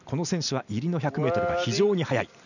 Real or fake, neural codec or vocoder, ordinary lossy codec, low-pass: real; none; none; 7.2 kHz